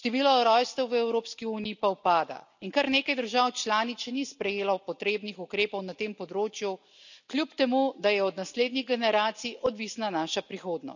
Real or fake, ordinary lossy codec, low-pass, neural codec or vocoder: real; none; 7.2 kHz; none